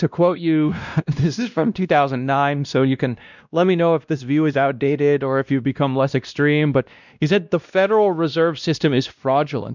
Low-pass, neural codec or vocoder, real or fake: 7.2 kHz; codec, 16 kHz, 1 kbps, X-Codec, WavLM features, trained on Multilingual LibriSpeech; fake